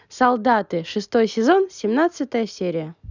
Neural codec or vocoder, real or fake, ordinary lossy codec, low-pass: none; real; none; 7.2 kHz